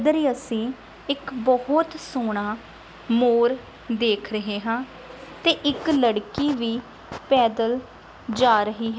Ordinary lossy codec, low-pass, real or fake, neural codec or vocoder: none; none; real; none